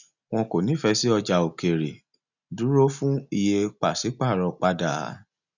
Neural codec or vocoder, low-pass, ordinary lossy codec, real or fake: none; 7.2 kHz; none; real